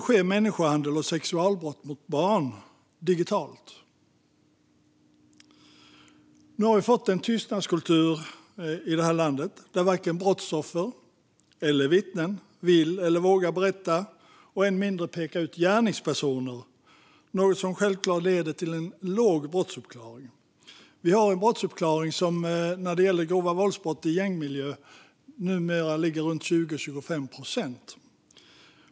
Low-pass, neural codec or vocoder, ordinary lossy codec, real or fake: none; none; none; real